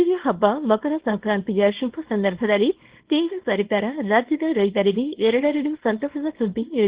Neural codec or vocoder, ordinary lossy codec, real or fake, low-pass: codec, 24 kHz, 0.9 kbps, WavTokenizer, small release; Opus, 16 kbps; fake; 3.6 kHz